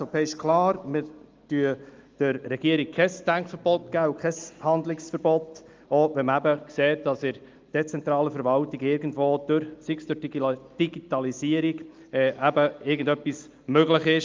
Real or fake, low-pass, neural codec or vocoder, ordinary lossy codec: real; 7.2 kHz; none; Opus, 32 kbps